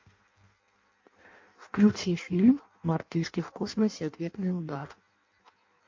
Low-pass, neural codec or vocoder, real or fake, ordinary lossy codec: 7.2 kHz; codec, 16 kHz in and 24 kHz out, 0.6 kbps, FireRedTTS-2 codec; fake; MP3, 48 kbps